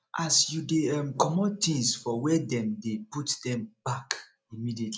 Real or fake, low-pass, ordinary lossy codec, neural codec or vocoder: real; none; none; none